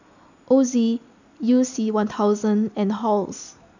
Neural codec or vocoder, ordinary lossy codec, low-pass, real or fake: none; none; 7.2 kHz; real